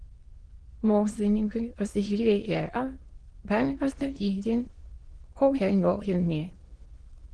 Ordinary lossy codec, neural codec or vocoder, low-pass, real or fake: Opus, 16 kbps; autoencoder, 22.05 kHz, a latent of 192 numbers a frame, VITS, trained on many speakers; 9.9 kHz; fake